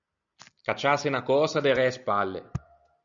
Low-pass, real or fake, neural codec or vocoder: 7.2 kHz; real; none